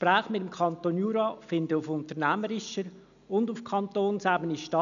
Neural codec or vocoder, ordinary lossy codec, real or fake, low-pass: none; none; real; 7.2 kHz